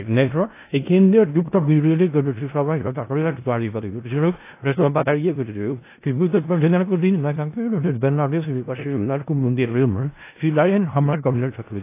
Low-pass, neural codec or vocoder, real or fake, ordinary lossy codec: 3.6 kHz; codec, 16 kHz in and 24 kHz out, 0.4 kbps, LongCat-Audio-Codec, four codebook decoder; fake; AAC, 24 kbps